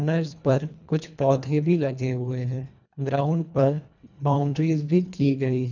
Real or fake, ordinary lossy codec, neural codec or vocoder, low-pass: fake; none; codec, 24 kHz, 1.5 kbps, HILCodec; 7.2 kHz